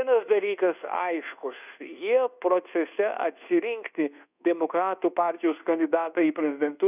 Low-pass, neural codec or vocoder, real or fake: 3.6 kHz; codec, 24 kHz, 1.2 kbps, DualCodec; fake